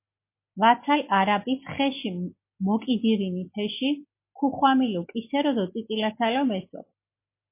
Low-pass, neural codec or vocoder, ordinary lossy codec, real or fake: 3.6 kHz; none; MP3, 24 kbps; real